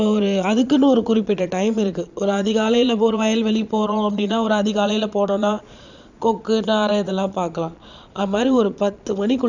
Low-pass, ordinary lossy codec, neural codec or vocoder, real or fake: 7.2 kHz; none; vocoder, 22.05 kHz, 80 mel bands, Vocos; fake